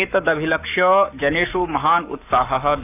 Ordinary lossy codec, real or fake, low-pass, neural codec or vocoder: none; fake; 3.6 kHz; codec, 44.1 kHz, 7.8 kbps, Pupu-Codec